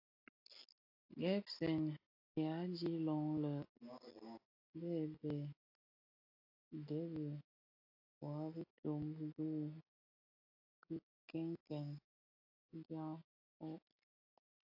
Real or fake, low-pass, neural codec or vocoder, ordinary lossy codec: real; 5.4 kHz; none; AAC, 48 kbps